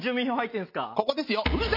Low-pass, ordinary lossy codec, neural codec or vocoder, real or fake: 5.4 kHz; MP3, 32 kbps; none; real